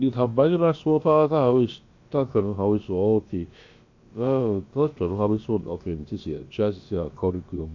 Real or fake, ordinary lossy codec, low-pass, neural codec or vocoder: fake; AAC, 48 kbps; 7.2 kHz; codec, 16 kHz, about 1 kbps, DyCAST, with the encoder's durations